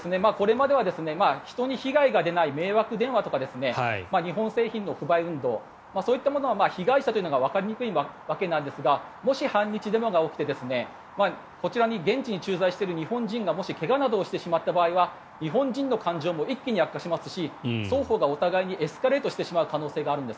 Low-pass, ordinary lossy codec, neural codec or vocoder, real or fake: none; none; none; real